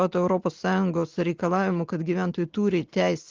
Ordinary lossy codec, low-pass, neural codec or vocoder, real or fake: Opus, 16 kbps; 7.2 kHz; none; real